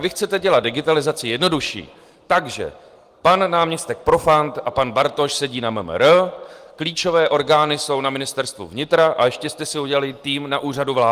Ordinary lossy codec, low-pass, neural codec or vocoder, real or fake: Opus, 24 kbps; 14.4 kHz; none; real